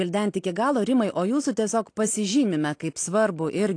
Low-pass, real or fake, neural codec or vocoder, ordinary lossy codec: 9.9 kHz; real; none; AAC, 48 kbps